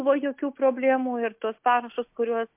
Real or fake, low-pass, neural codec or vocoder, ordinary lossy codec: real; 3.6 kHz; none; MP3, 32 kbps